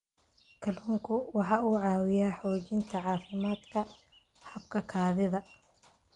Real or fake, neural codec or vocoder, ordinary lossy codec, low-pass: real; none; Opus, 32 kbps; 10.8 kHz